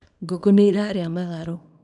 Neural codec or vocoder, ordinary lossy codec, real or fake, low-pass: codec, 24 kHz, 0.9 kbps, WavTokenizer, small release; none; fake; 10.8 kHz